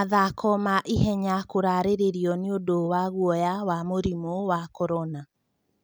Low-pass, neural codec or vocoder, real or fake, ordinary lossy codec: none; none; real; none